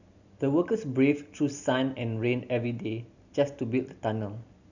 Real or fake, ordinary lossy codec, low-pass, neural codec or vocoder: real; none; 7.2 kHz; none